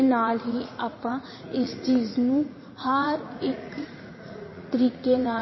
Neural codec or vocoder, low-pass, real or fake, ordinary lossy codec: vocoder, 44.1 kHz, 80 mel bands, Vocos; 7.2 kHz; fake; MP3, 24 kbps